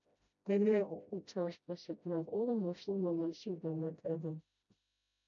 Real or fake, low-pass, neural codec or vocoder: fake; 7.2 kHz; codec, 16 kHz, 0.5 kbps, FreqCodec, smaller model